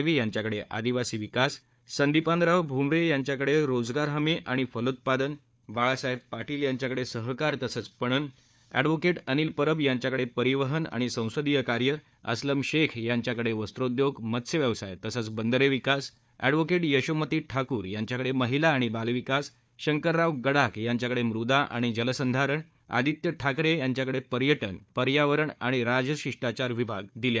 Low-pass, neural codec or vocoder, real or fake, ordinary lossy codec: none; codec, 16 kHz, 4 kbps, FunCodec, trained on Chinese and English, 50 frames a second; fake; none